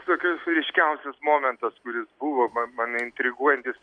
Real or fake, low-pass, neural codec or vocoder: real; 9.9 kHz; none